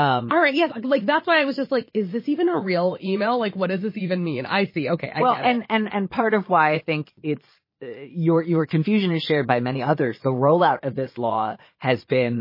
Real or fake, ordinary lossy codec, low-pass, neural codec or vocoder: fake; MP3, 24 kbps; 5.4 kHz; vocoder, 22.05 kHz, 80 mel bands, Vocos